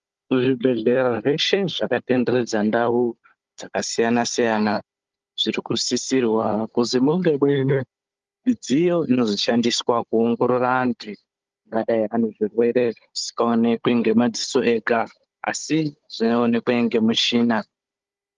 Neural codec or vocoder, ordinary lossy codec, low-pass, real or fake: codec, 16 kHz, 16 kbps, FunCodec, trained on Chinese and English, 50 frames a second; Opus, 32 kbps; 7.2 kHz; fake